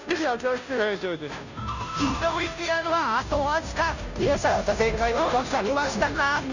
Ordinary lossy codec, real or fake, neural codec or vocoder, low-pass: none; fake; codec, 16 kHz, 0.5 kbps, FunCodec, trained on Chinese and English, 25 frames a second; 7.2 kHz